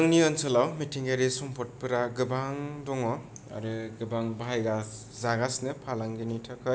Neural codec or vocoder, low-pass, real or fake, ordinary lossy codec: none; none; real; none